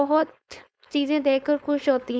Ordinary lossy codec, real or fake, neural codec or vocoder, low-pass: none; fake; codec, 16 kHz, 4.8 kbps, FACodec; none